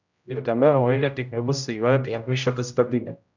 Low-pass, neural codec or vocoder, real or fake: 7.2 kHz; codec, 16 kHz, 0.5 kbps, X-Codec, HuBERT features, trained on general audio; fake